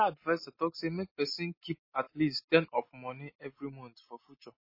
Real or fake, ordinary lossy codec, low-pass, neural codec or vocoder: real; MP3, 24 kbps; 5.4 kHz; none